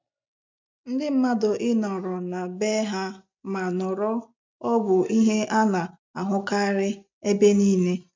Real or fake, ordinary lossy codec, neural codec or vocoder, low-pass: real; MP3, 64 kbps; none; 7.2 kHz